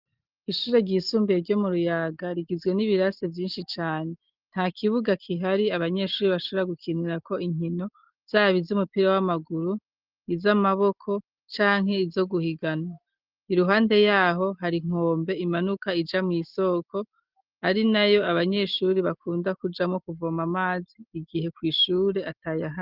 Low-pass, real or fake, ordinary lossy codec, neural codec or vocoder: 5.4 kHz; real; Opus, 32 kbps; none